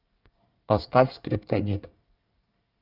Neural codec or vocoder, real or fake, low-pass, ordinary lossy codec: codec, 24 kHz, 1 kbps, SNAC; fake; 5.4 kHz; Opus, 24 kbps